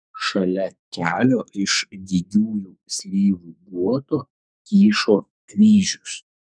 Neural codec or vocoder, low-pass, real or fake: codec, 44.1 kHz, 2.6 kbps, SNAC; 9.9 kHz; fake